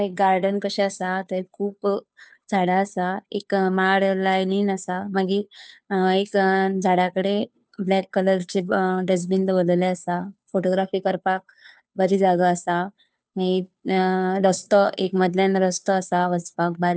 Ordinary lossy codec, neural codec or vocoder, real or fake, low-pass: none; codec, 16 kHz, 2 kbps, FunCodec, trained on Chinese and English, 25 frames a second; fake; none